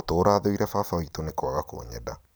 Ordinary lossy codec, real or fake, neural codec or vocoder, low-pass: none; fake; vocoder, 44.1 kHz, 128 mel bands every 256 samples, BigVGAN v2; none